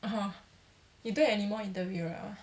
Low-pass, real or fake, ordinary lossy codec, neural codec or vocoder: none; real; none; none